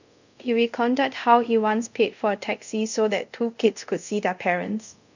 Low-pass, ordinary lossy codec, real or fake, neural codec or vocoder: 7.2 kHz; none; fake; codec, 24 kHz, 0.5 kbps, DualCodec